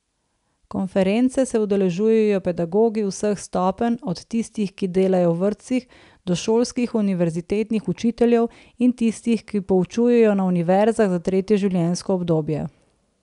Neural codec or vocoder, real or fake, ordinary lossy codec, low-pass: none; real; none; 10.8 kHz